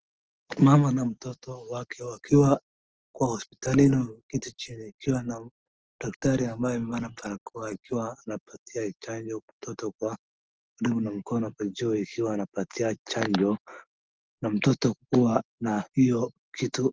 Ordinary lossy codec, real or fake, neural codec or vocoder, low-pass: Opus, 16 kbps; real; none; 7.2 kHz